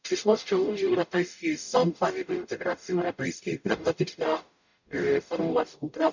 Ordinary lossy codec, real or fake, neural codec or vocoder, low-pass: none; fake; codec, 44.1 kHz, 0.9 kbps, DAC; 7.2 kHz